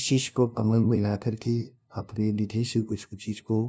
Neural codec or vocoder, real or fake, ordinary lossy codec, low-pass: codec, 16 kHz, 0.5 kbps, FunCodec, trained on LibriTTS, 25 frames a second; fake; none; none